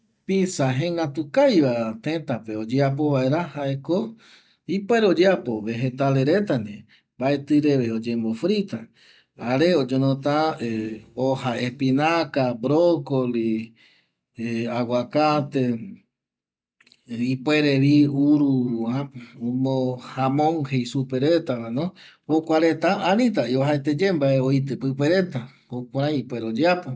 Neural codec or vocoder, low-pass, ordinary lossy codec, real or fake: none; none; none; real